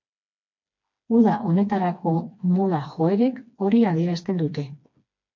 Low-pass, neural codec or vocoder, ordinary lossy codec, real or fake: 7.2 kHz; codec, 16 kHz, 2 kbps, FreqCodec, smaller model; MP3, 48 kbps; fake